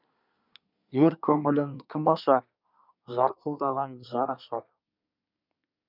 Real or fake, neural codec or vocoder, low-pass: fake; codec, 24 kHz, 1 kbps, SNAC; 5.4 kHz